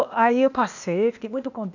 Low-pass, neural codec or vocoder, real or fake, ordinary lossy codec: 7.2 kHz; codec, 16 kHz, 0.8 kbps, ZipCodec; fake; none